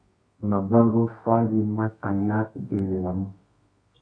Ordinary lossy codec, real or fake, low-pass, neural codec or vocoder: AAC, 48 kbps; fake; 9.9 kHz; codec, 24 kHz, 0.9 kbps, WavTokenizer, medium music audio release